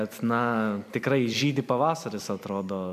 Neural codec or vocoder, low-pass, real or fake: vocoder, 44.1 kHz, 128 mel bands every 256 samples, BigVGAN v2; 14.4 kHz; fake